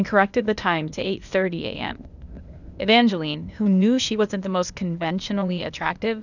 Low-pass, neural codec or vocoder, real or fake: 7.2 kHz; codec, 16 kHz, 0.8 kbps, ZipCodec; fake